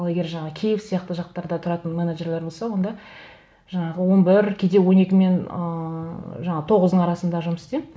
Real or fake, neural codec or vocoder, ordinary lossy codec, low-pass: real; none; none; none